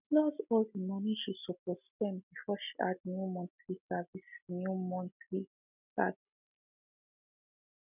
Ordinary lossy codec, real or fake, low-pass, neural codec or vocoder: none; real; 3.6 kHz; none